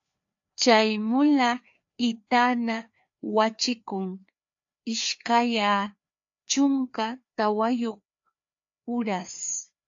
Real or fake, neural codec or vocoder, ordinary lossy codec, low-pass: fake; codec, 16 kHz, 2 kbps, FreqCodec, larger model; AAC, 64 kbps; 7.2 kHz